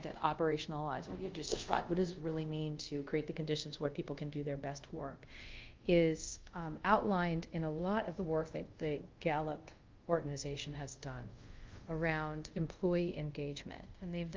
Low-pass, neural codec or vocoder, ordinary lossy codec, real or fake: 7.2 kHz; codec, 24 kHz, 0.5 kbps, DualCodec; Opus, 32 kbps; fake